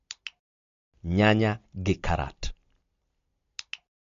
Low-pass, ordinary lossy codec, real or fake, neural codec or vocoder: 7.2 kHz; MP3, 48 kbps; real; none